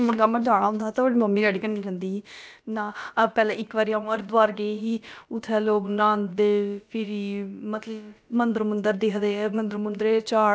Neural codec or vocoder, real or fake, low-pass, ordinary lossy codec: codec, 16 kHz, about 1 kbps, DyCAST, with the encoder's durations; fake; none; none